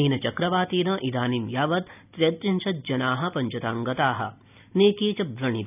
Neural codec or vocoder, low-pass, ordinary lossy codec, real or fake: none; 3.6 kHz; none; real